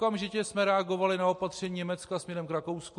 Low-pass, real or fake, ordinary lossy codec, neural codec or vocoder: 10.8 kHz; real; MP3, 48 kbps; none